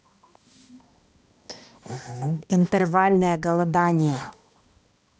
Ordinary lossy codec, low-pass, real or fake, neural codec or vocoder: none; none; fake; codec, 16 kHz, 1 kbps, X-Codec, HuBERT features, trained on balanced general audio